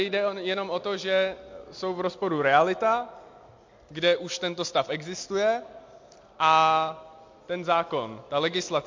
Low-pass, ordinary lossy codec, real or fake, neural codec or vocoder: 7.2 kHz; MP3, 48 kbps; real; none